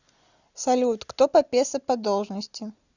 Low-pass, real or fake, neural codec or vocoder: 7.2 kHz; real; none